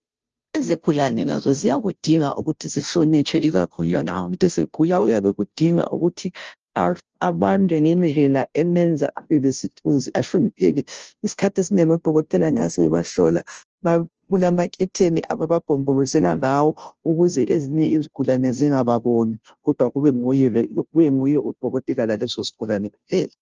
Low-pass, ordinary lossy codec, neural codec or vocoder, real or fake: 7.2 kHz; Opus, 24 kbps; codec, 16 kHz, 0.5 kbps, FunCodec, trained on Chinese and English, 25 frames a second; fake